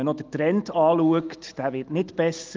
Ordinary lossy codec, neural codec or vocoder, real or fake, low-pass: Opus, 24 kbps; none; real; 7.2 kHz